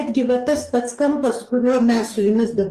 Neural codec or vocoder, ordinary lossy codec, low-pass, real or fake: codec, 44.1 kHz, 2.6 kbps, DAC; Opus, 24 kbps; 14.4 kHz; fake